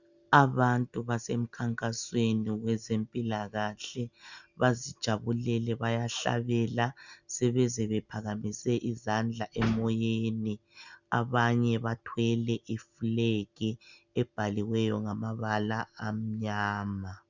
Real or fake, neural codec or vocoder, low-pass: real; none; 7.2 kHz